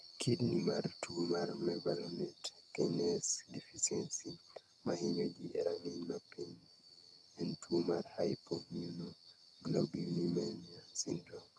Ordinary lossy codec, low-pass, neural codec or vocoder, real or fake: none; none; vocoder, 22.05 kHz, 80 mel bands, HiFi-GAN; fake